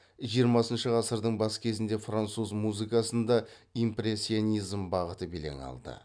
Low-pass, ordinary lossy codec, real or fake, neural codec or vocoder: 9.9 kHz; none; real; none